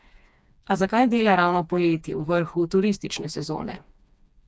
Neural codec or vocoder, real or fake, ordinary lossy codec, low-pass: codec, 16 kHz, 2 kbps, FreqCodec, smaller model; fake; none; none